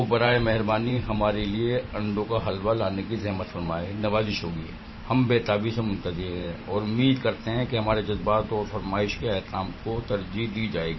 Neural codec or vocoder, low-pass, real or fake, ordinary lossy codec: vocoder, 44.1 kHz, 128 mel bands every 512 samples, BigVGAN v2; 7.2 kHz; fake; MP3, 24 kbps